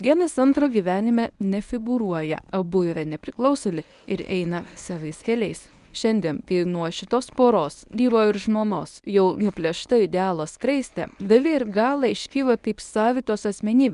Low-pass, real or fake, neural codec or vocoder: 10.8 kHz; fake; codec, 24 kHz, 0.9 kbps, WavTokenizer, medium speech release version 1